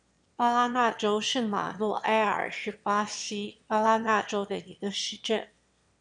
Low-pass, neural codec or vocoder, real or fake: 9.9 kHz; autoencoder, 22.05 kHz, a latent of 192 numbers a frame, VITS, trained on one speaker; fake